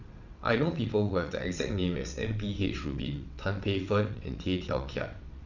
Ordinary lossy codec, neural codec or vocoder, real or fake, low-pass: none; vocoder, 22.05 kHz, 80 mel bands, WaveNeXt; fake; 7.2 kHz